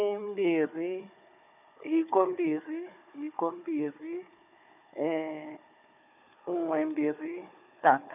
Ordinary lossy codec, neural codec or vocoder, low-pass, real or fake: none; codec, 16 kHz, 4 kbps, FunCodec, trained on Chinese and English, 50 frames a second; 3.6 kHz; fake